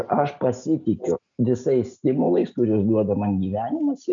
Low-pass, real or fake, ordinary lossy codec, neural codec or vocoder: 7.2 kHz; real; MP3, 64 kbps; none